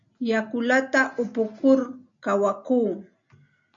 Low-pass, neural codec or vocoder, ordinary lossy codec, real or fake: 7.2 kHz; none; MP3, 48 kbps; real